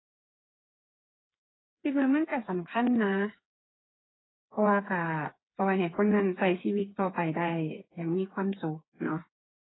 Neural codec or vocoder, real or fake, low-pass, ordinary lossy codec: codec, 16 kHz, 4 kbps, FreqCodec, smaller model; fake; 7.2 kHz; AAC, 16 kbps